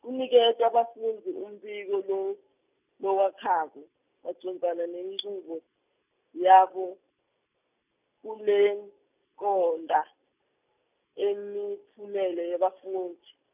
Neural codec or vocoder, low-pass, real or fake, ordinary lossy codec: none; 3.6 kHz; real; none